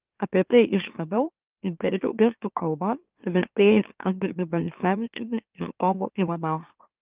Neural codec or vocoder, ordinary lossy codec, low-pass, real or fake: autoencoder, 44.1 kHz, a latent of 192 numbers a frame, MeloTTS; Opus, 24 kbps; 3.6 kHz; fake